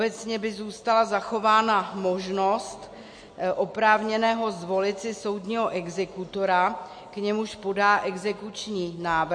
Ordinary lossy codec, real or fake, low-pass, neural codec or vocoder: MP3, 48 kbps; real; 9.9 kHz; none